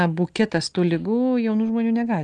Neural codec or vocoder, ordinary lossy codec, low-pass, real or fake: none; Opus, 32 kbps; 9.9 kHz; real